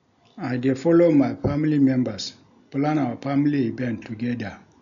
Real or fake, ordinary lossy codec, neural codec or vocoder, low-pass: real; none; none; 7.2 kHz